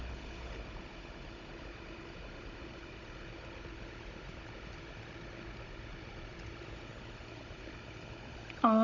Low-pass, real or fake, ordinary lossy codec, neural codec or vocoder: 7.2 kHz; fake; AAC, 48 kbps; codec, 16 kHz, 16 kbps, FunCodec, trained on Chinese and English, 50 frames a second